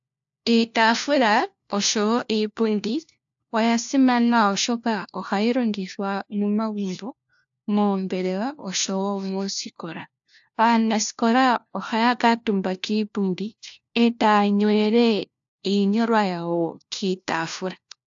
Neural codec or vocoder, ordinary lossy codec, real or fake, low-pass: codec, 16 kHz, 1 kbps, FunCodec, trained on LibriTTS, 50 frames a second; AAC, 64 kbps; fake; 7.2 kHz